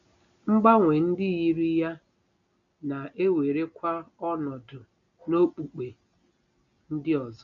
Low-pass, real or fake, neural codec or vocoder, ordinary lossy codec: 7.2 kHz; real; none; none